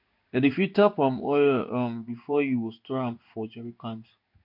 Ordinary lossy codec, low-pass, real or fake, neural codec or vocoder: MP3, 48 kbps; 5.4 kHz; fake; codec, 16 kHz in and 24 kHz out, 1 kbps, XY-Tokenizer